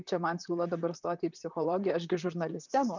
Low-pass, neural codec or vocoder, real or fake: 7.2 kHz; vocoder, 44.1 kHz, 128 mel bands, Pupu-Vocoder; fake